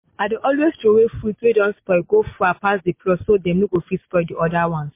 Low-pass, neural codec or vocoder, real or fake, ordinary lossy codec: 3.6 kHz; none; real; MP3, 24 kbps